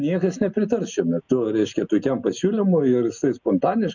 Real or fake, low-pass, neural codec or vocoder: real; 7.2 kHz; none